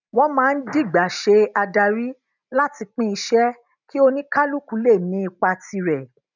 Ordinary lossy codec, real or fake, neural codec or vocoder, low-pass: none; real; none; 7.2 kHz